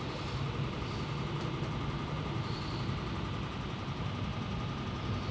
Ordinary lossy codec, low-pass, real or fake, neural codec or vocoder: none; none; real; none